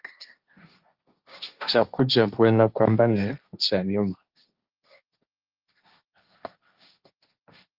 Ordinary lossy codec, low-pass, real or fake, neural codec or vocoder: Opus, 24 kbps; 5.4 kHz; fake; codec, 16 kHz, 1.1 kbps, Voila-Tokenizer